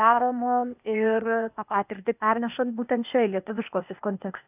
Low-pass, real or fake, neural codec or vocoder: 3.6 kHz; fake; codec, 16 kHz, 0.8 kbps, ZipCodec